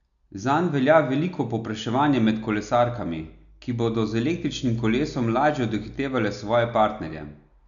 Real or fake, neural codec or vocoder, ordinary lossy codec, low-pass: real; none; none; 7.2 kHz